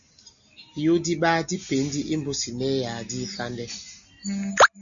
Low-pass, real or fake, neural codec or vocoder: 7.2 kHz; real; none